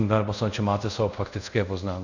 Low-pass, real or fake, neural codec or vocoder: 7.2 kHz; fake; codec, 24 kHz, 0.5 kbps, DualCodec